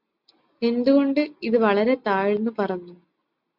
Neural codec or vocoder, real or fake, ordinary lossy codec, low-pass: none; real; MP3, 48 kbps; 5.4 kHz